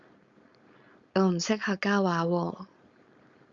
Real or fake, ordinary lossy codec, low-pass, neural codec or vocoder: fake; Opus, 64 kbps; 7.2 kHz; codec, 16 kHz, 4.8 kbps, FACodec